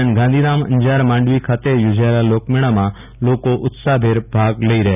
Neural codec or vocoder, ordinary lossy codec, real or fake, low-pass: none; none; real; 3.6 kHz